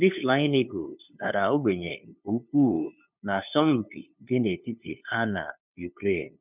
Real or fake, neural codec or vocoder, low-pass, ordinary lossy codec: fake; codec, 16 kHz, 2 kbps, FunCodec, trained on LibriTTS, 25 frames a second; 3.6 kHz; none